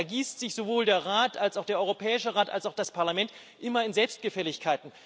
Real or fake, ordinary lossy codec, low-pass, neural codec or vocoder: real; none; none; none